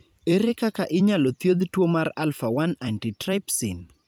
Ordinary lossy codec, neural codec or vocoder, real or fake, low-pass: none; vocoder, 44.1 kHz, 128 mel bands every 256 samples, BigVGAN v2; fake; none